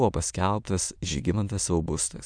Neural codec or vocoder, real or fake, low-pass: autoencoder, 22.05 kHz, a latent of 192 numbers a frame, VITS, trained on many speakers; fake; 9.9 kHz